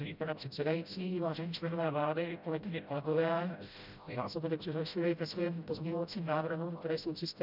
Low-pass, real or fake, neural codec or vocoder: 5.4 kHz; fake; codec, 16 kHz, 0.5 kbps, FreqCodec, smaller model